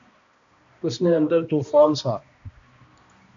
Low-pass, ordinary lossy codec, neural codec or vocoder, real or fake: 7.2 kHz; MP3, 64 kbps; codec, 16 kHz, 1 kbps, X-Codec, HuBERT features, trained on balanced general audio; fake